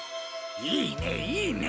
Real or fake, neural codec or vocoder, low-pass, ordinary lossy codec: real; none; none; none